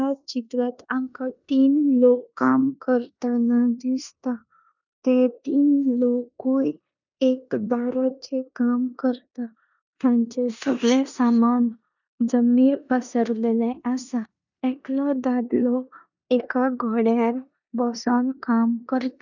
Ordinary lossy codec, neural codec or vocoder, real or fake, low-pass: none; codec, 16 kHz in and 24 kHz out, 0.9 kbps, LongCat-Audio-Codec, four codebook decoder; fake; 7.2 kHz